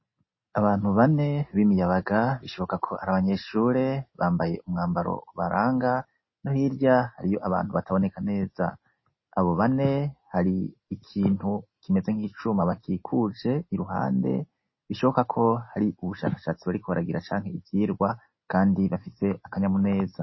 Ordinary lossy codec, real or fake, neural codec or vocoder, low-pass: MP3, 24 kbps; real; none; 7.2 kHz